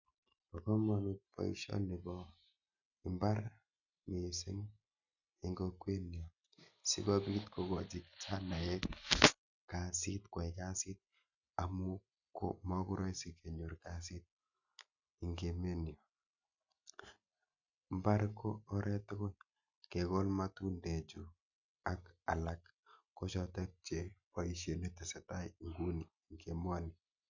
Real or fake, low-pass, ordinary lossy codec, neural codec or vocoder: real; 7.2 kHz; none; none